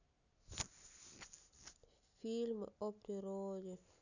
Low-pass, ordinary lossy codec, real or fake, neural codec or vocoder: 7.2 kHz; none; real; none